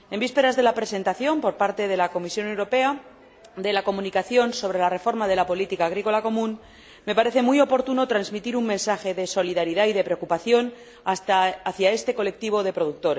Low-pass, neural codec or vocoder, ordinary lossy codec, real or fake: none; none; none; real